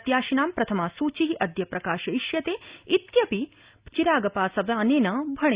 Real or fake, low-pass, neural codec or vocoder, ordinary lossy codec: real; 3.6 kHz; none; Opus, 64 kbps